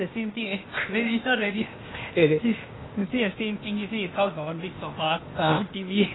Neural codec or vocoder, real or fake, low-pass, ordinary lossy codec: codec, 16 kHz, 0.8 kbps, ZipCodec; fake; 7.2 kHz; AAC, 16 kbps